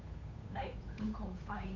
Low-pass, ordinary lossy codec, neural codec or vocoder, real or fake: 7.2 kHz; none; codec, 16 kHz, 8 kbps, FunCodec, trained on Chinese and English, 25 frames a second; fake